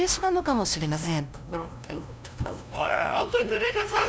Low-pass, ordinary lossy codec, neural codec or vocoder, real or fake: none; none; codec, 16 kHz, 0.5 kbps, FunCodec, trained on LibriTTS, 25 frames a second; fake